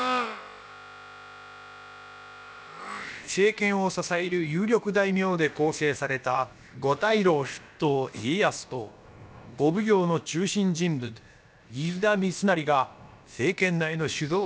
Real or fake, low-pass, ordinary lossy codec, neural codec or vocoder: fake; none; none; codec, 16 kHz, about 1 kbps, DyCAST, with the encoder's durations